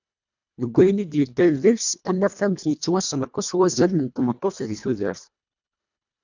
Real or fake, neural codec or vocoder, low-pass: fake; codec, 24 kHz, 1.5 kbps, HILCodec; 7.2 kHz